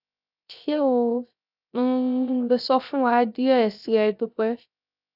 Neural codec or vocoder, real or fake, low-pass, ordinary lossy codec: codec, 16 kHz, 0.3 kbps, FocalCodec; fake; 5.4 kHz; none